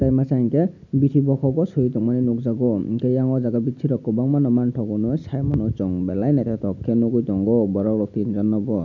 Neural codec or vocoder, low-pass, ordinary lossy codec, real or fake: none; 7.2 kHz; none; real